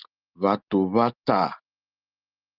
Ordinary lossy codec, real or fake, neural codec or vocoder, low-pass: Opus, 24 kbps; real; none; 5.4 kHz